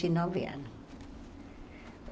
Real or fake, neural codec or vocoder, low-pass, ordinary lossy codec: real; none; none; none